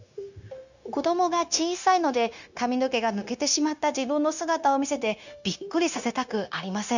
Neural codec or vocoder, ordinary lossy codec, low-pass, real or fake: codec, 16 kHz, 0.9 kbps, LongCat-Audio-Codec; none; 7.2 kHz; fake